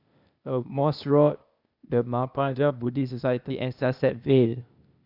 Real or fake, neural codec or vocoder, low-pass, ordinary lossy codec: fake; codec, 16 kHz, 0.8 kbps, ZipCodec; 5.4 kHz; none